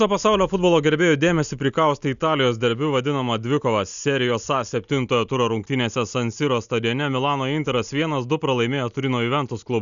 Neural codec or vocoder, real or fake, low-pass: none; real; 7.2 kHz